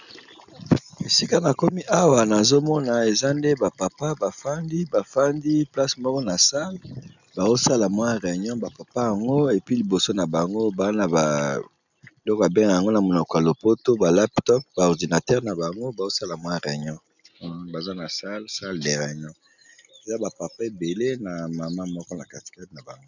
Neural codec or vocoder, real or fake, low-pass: none; real; 7.2 kHz